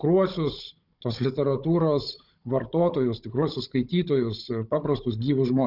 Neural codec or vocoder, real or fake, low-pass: codec, 16 kHz, 16 kbps, FunCodec, trained on Chinese and English, 50 frames a second; fake; 5.4 kHz